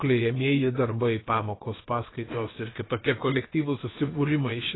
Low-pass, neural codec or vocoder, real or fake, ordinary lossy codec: 7.2 kHz; codec, 16 kHz, about 1 kbps, DyCAST, with the encoder's durations; fake; AAC, 16 kbps